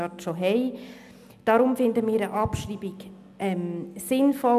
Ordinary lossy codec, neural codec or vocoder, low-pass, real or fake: none; none; 14.4 kHz; real